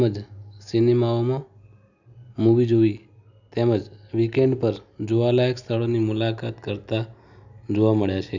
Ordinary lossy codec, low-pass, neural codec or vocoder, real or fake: none; 7.2 kHz; none; real